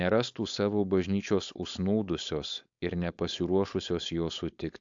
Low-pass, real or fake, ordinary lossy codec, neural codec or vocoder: 7.2 kHz; fake; AAC, 64 kbps; codec, 16 kHz, 4.8 kbps, FACodec